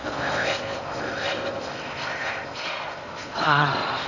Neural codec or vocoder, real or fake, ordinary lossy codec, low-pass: codec, 16 kHz in and 24 kHz out, 0.6 kbps, FocalCodec, streaming, 4096 codes; fake; none; 7.2 kHz